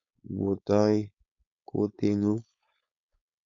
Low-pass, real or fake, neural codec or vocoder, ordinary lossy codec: 7.2 kHz; fake; codec, 16 kHz, 4.8 kbps, FACodec; AAC, 64 kbps